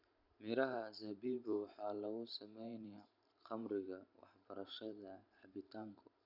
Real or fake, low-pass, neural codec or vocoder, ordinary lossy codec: fake; 5.4 kHz; vocoder, 44.1 kHz, 128 mel bands every 512 samples, BigVGAN v2; none